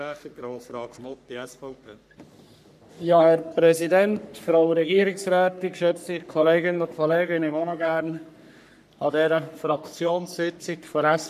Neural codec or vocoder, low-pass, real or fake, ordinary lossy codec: codec, 44.1 kHz, 3.4 kbps, Pupu-Codec; 14.4 kHz; fake; none